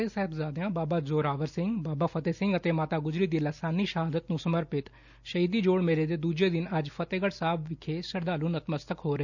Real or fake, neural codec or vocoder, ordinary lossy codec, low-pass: real; none; none; 7.2 kHz